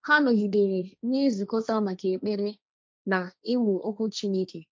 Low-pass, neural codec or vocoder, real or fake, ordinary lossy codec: none; codec, 16 kHz, 1.1 kbps, Voila-Tokenizer; fake; none